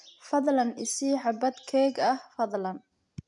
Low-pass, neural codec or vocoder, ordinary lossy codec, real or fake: 10.8 kHz; none; MP3, 96 kbps; real